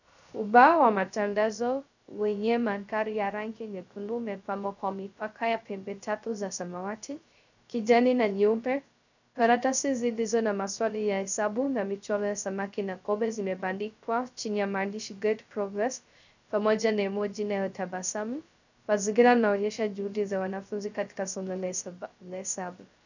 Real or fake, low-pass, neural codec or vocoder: fake; 7.2 kHz; codec, 16 kHz, 0.3 kbps, FocalCodec